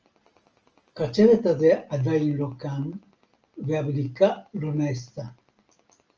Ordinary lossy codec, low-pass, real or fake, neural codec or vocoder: Opus, 24 kbps; 7.2 kHz; real; none